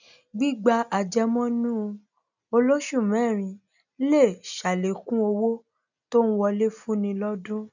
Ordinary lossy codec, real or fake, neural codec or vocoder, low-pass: none; real; none; 7.2 kHz